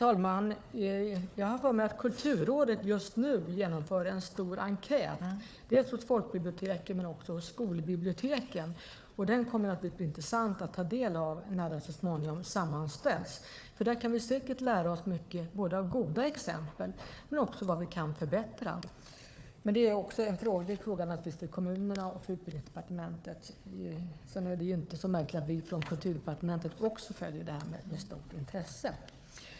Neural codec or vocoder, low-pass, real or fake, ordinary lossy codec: codec, 16 kHz, 4 kbps, FunCodec, trained on Chinese and English, 50 frames a second; none; fake; none